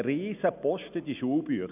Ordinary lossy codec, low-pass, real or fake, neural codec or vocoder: none; 3.6 kHz; real; none